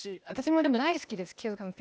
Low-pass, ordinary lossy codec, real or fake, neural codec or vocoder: none; none; fake; codec, 16 kHz, 0.8 kbps, ZipCodec